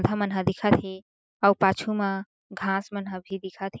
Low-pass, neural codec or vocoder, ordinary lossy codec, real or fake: none; none; none; real